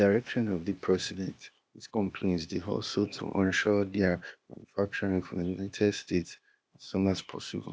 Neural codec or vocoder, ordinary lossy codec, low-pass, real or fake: codec, 16 kHz, 0.8 kbps, ZipCodec; none; none; fake